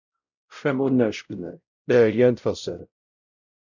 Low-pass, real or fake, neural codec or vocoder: 7.2 kHz; fake; codec, 16 kHz, 0.5 kbps, X-Codec, WavLM features, trained on Multilingual LibriSpeech